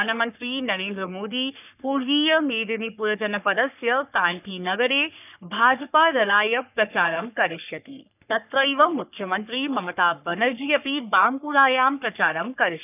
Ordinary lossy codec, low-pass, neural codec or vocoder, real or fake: none; 3.6 kHz; codec, 44.1 kHz, 3.4 kbps, Pupu-Codec; fake